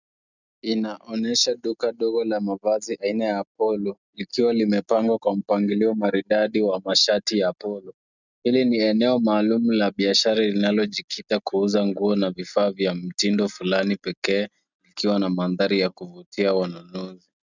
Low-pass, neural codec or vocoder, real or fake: 7.2 kHz; none; real